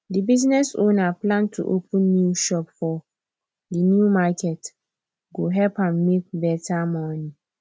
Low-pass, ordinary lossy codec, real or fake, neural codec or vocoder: none; none; real; none